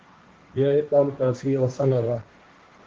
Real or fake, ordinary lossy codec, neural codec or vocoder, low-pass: fake; Opus, 16 kbps; codec, 16 kHz, 1.1 kbps, Voila-Tokenizer; 7.2 kHz